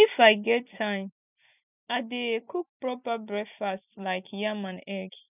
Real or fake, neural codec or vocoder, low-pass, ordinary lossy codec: real; none; 3.6 kHz; none